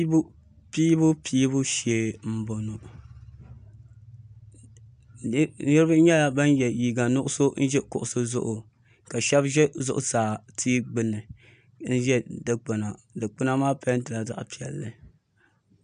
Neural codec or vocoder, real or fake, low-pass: none; real; 9.9 kHz